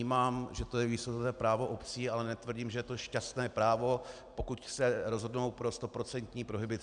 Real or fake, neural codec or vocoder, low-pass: real; none; 9.9 kHz